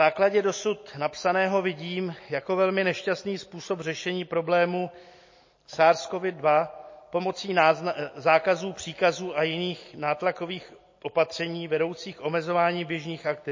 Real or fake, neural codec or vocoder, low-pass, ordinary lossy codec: real; none; 7.2 kHz; MP3, 32 kbps